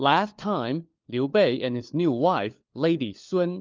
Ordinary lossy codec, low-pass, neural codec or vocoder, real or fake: Opus, 24 kbps; 7.2 kHz; codec, 16 kHz, 4 kbps, FunCodec, trained on LibriTTS, 50 frames a second; fake